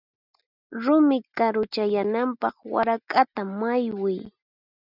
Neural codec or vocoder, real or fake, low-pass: none; real; 5.4 kHz